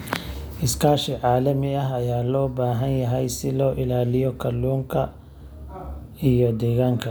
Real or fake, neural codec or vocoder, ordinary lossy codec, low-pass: real; none; none; none